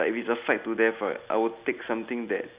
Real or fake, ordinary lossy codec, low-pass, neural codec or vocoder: real; Opus, 64 kbps; 3.6 kHz; none